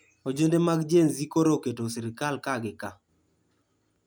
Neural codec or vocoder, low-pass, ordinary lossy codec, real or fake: none; none; none; real